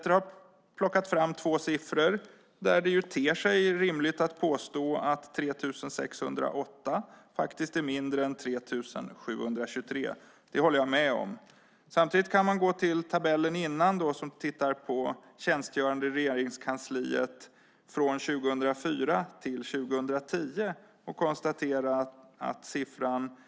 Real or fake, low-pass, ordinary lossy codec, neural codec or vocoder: real; none; none; none